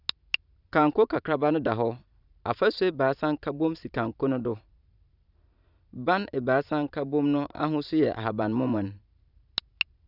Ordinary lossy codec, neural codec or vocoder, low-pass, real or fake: none; vocoder, 44.1 kHz, 128 mel bands every 512 samples, BigVGAN v2; 5.4 kHz; fake